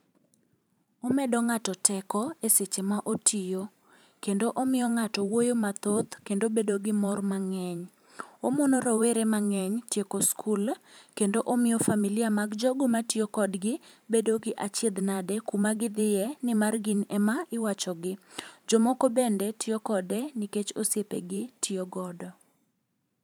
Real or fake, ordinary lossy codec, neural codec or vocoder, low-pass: fake; none; vocoder, 44.1 kHz, 128 mel bands every 512 samples, BigVGAN v2; none